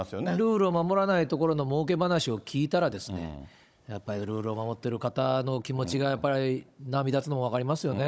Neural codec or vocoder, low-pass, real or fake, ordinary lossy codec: codec, 16 kHz, 16 kbps, FunCodec, trained on Chinese and English, 50 frames a second; none; fake; none